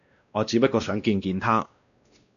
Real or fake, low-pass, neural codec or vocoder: fake; 7.2 kHz; codec, 16 kHz, 1 kbps, X-Codec, WavLM features, trained on Multilingual LibriSpeech